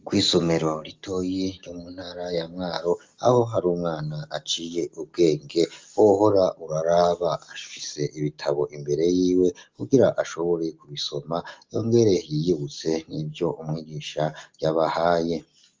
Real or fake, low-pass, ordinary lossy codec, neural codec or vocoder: real; 7.2 kHz; Opus, 32 kbps; none